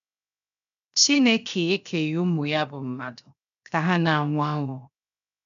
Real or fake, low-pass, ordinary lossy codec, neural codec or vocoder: fake; 7.2 kHz; none; codec, 16 kHz, 0.7 kbps, FocalCodec